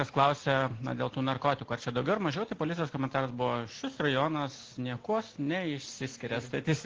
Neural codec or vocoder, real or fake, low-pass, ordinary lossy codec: none; real; 7.2 kHz; Opus, 16 kbps